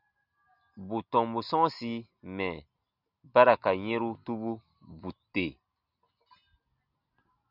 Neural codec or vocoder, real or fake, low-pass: none; real; 5.4 kHz